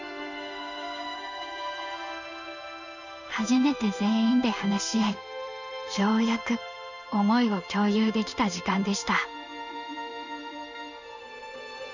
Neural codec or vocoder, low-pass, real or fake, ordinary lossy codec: codec, 16 kHz in and 24 kHz out, 1 kbps, XY-Tokenizer; 7.2 kHz; fake; none